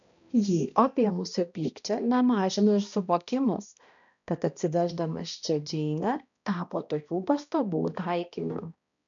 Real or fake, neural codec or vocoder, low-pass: fake; codec, 16 kHz, 1 kbps, X-Codec, HuBERT features, trained on balanced general audio; 7.2 kHz